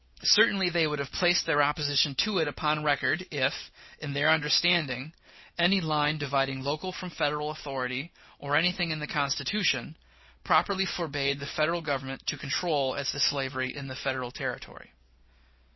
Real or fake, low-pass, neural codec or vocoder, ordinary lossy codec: real; 7.2 kHz; none; MP3, 24 kbps